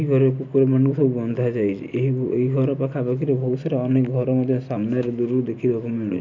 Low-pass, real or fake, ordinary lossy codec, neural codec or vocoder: 7.2 kHz; real; MP3, 64 kbps; none